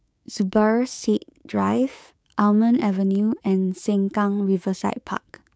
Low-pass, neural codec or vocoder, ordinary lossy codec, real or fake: none; codec, 16 kHz, 6 kbps, DAC; none; fake